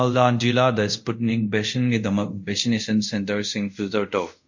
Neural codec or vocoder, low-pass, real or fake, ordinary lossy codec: codec, 24 kHz, 0.5 kbps, DualCodec; 7.2 kHz; fake; MP3, 48 kbps